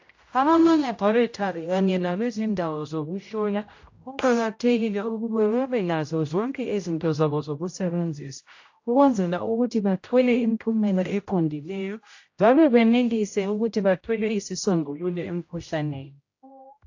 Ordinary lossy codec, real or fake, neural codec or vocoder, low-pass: AAC, 48 kbps; fake; codec, 16 kHz, 0.5 kbps, X-Codec, HuBERT features, trained on general audio; 7.2 kHz